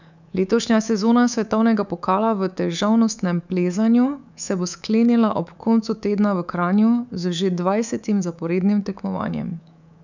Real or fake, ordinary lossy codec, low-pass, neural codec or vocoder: fake; none; 7.2 kHz; codec, 24 kHz, 3.1 kbps, DualCodec